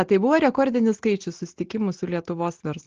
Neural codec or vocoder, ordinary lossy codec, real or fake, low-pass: none; Opus, 16 kbps; real; 7.2 kHz